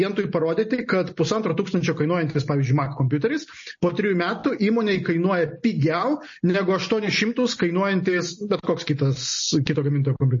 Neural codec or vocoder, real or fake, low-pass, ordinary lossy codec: none; real; 7.2 kHz; MP3, 32 kbps